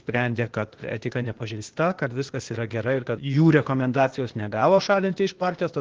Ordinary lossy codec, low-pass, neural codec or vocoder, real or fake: Opus, 16 kbps; 7.2 kHz; codec, 16 kHz, 0.8 kbps, ZipCodec; fake